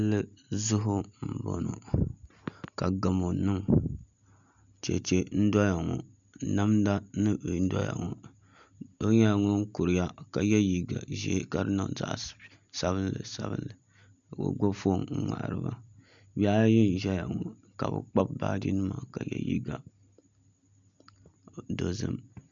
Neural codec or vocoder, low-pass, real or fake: none; 7.2 kHz; real